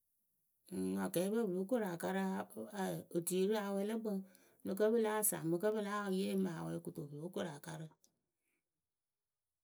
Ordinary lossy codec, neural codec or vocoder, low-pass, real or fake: none; none; none; real